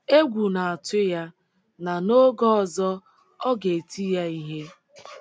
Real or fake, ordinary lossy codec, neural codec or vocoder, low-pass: real; none; none; none